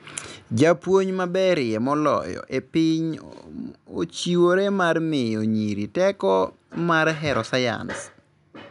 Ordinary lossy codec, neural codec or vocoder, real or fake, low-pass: none; none; real; 10.8 kHz